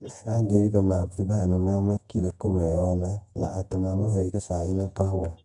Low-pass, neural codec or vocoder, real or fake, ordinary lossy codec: 10.8 kHz; codec, 24 kHz, 0.9 kbps, WavTokenizer, medium music audio release; fake; none